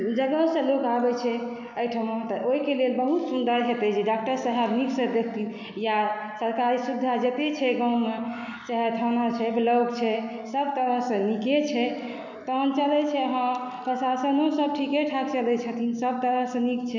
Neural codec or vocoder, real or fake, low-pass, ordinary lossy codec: none; real; 7.2 kHz; none